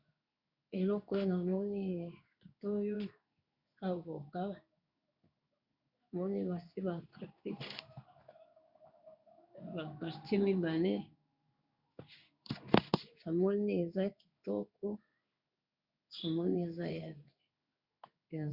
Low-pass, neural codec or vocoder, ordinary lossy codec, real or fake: 5.4 kHz; codec, 16 kHz in and 24 kHz out, 1 kbps, XY-Tokenizer; Opus, 64 kbps; fake